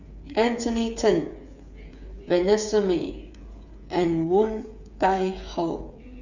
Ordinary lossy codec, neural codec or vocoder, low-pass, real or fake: none; codec, 16 kHz, 8 kbps, FreqCodec, smaller model; 7.2 kHz; fake